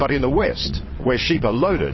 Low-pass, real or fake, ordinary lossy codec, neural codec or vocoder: 7.2 kHz; fake; MP3, 24 kbps; vocoder, 22.05 kHz, 80 mel bands, WaveNeXt